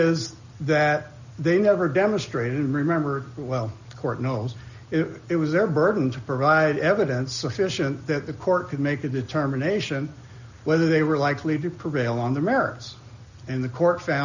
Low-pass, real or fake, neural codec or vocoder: 7.2 kHz; real; none